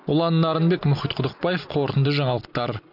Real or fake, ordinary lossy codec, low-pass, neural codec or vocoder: real; none; 5.4 kHz; none